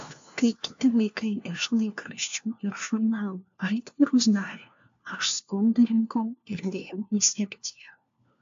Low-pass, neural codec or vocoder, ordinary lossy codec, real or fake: 7.2 kHz; codec, 16 kHz, 1 kbps, FunCodec, trained on LibriTTS, 50 frames a second; AAC, 64 kbps; fake